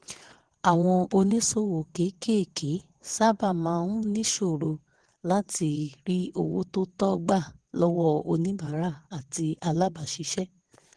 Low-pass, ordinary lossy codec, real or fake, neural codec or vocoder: 9.9 kHz; Opus, 16 kbps; fake; vocoder, 22.05 kHz, 80 mel bands, WaveNeXt